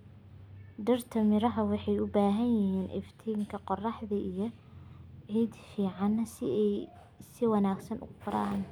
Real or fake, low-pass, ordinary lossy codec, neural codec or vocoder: real; 19.8 kHz; none; none